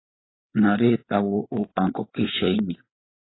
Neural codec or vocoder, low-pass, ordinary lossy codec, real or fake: codec, 16 kHz, 8 kbps, FreqCodec, larger model; 7.2 kHz; AAC, 16 kbps; fake